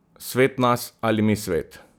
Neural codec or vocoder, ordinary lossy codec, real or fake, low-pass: none; none; real; none